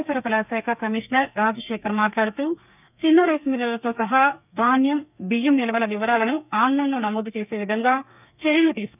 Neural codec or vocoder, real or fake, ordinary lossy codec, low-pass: codec, 32 kHz, 1.9 kbps, SNAC; fake; none; 3.6 kHz